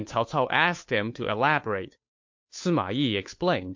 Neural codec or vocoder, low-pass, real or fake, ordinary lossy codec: codec, 16 kHz, 2 kbps, FunCodec, trained on Chinese and English, 25 frames a second; 7.2 kHz; fake; MP3, 48 kbps